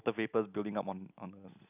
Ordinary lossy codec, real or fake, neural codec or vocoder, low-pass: none; real; none; 3.6 kHz